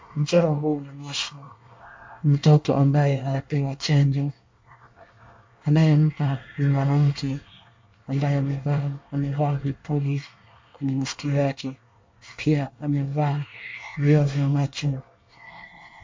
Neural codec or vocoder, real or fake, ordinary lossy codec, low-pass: codec, 24 kHz, 1 kbps, SNAC; fake; MP3, 48 kbps; 7.2 kHz